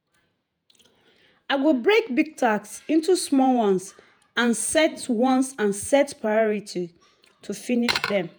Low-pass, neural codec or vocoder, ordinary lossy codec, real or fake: none; vocoder, 48 kHz, 128 mel bands, Vocos; none; fake